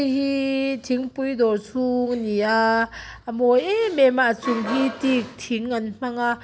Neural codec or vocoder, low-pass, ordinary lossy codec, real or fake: none; none; none; real